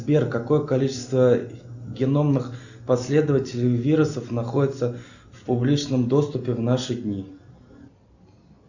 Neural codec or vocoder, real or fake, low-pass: none; real; 7.2 kHz